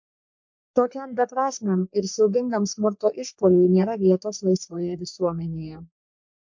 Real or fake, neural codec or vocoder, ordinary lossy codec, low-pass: fake; codec, 44.1 kHz, 3.4 kbps, Pupu-Codec; MP3, 48 kbps; 7.2 kHz